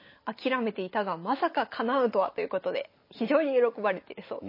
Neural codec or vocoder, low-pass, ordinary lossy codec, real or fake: none; 5.4 kHz; MP3, 24 kbps; real